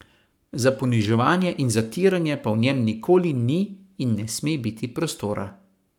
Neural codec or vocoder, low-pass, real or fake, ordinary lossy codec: codec, 44.1 kHz, 7.8 kbps, Pupu-Codec; 19.8 kHz; fake; none